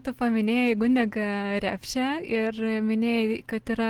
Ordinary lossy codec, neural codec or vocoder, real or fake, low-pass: Opus, 16 kbps; none; real; 19.8 kHz